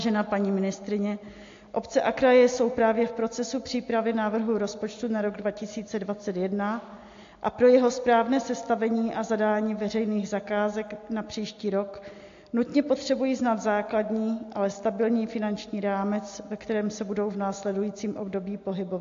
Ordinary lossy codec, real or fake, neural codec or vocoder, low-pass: AAC, 48 kbps; real; none; 7.2 kHz